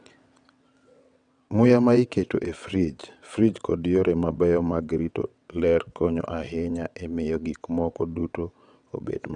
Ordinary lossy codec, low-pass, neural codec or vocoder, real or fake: none; 9.9 kHz; vocoder, 22.05 kHz, 80 mel bands, WaveNeXt; fake